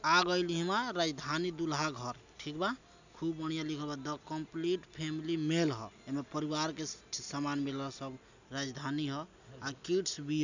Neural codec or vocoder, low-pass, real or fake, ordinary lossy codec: none; 7.2 kHz; real; none